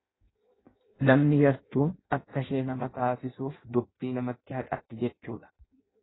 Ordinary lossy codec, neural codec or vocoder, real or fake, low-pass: AAC, 16 kbps; codec, 16 kHz in and 24 kHz out, 0.6 kbps, FireRedTTS-2 codec; fake; 7.2 kHz